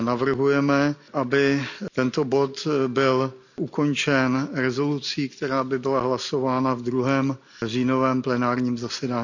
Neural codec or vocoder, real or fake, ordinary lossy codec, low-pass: none; real; none; 7.2 kHz